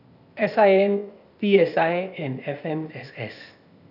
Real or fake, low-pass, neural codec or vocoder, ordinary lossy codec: fake; 5.4 kHz; codec, 16 kHz, 0.8 kbps, ZipCodec; none